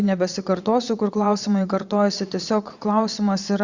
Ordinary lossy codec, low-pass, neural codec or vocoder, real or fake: Opus, 64 kbps; 7.2 kHz; vocoder, 22.05 kHz, 80 mel bands, Vocos; fake